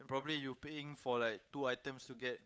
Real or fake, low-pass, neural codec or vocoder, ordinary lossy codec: fake; none; codec, 16 kHz, 8 kbps, FunCodec, trained on Chinese and English, 25 frames a second; none